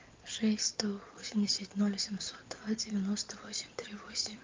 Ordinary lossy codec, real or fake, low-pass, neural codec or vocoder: Opus, 32 kbps; fake; 7.2 kHz; codec, 16 kHz in and 24 kHz out, 2.2 kbps, FireRedTTS-2 codec